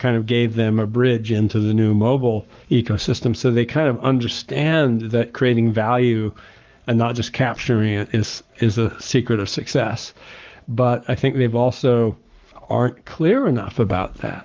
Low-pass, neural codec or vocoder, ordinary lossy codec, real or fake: 7.2 kHz; codec, 44.1 kHz, 7.8 kbps, Pupu-Codec; Opus, 24 kbps; fake